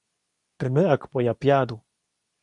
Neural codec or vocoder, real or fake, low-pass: codec, 24 kHz, 0.9 kbps, WavTokenizer, medium speech release version 2; fake; 10.8 kHz